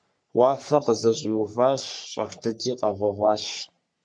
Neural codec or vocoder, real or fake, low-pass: codec, 44.1 kHz, 3.4 kbps, Pupu-Codec; fake; 9.9 kHz